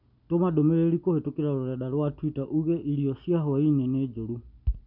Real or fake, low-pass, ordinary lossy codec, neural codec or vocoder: real; 5.4 kHz; none; none